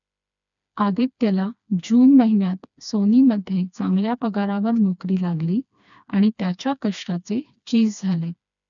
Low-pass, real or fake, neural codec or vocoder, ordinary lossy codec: 7.2 kHz; fake; codec, 16 kHz, 2 kbps, FreqCodec, smaller model; none